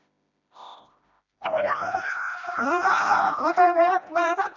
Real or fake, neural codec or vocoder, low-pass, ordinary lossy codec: fake; codec, 16 kHz, 1 kbps, FreqCodec, smaller model; 7.2 kHz; none